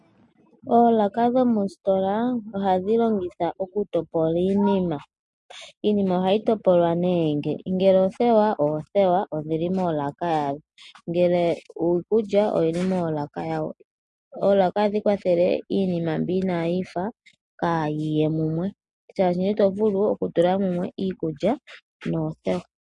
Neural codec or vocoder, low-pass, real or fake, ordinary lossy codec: none; 10.8 kHz; real; MP3, 48 kbps